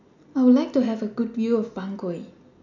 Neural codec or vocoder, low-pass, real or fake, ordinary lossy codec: none; 7.2 kHz; real; none